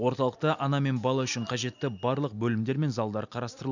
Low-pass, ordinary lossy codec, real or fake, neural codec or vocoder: 7.2 kHz; none; real; none